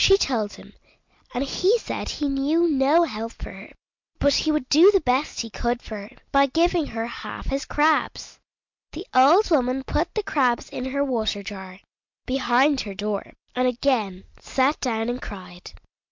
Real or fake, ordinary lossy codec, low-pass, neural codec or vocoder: real; MP3, 64 kbps; 7.2 kHz; none